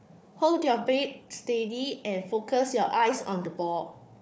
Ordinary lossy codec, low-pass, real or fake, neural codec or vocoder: none; none; fake; codec, 16 kHz, 4 kbps, FunCodec, trained on Chinese and English, 50 frames a second